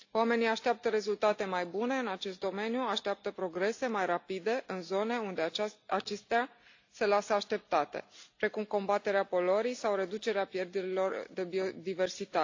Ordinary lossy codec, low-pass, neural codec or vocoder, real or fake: AAC, 48 kbps; 7.2 kHz; none; real